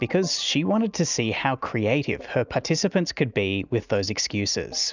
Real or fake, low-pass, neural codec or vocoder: real; 7.2 kHz; none